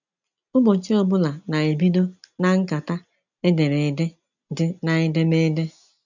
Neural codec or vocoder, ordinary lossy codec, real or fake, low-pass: none; none; real; 7.2 kHz